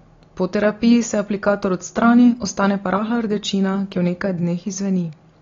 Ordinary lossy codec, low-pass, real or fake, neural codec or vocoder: AAC, 32 kbps; 7.2 kHz; real; none